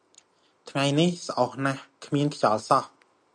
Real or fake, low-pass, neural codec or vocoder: real; 9.9 kHz; none